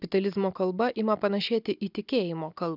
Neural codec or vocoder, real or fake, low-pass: none; real; 5.4 kHz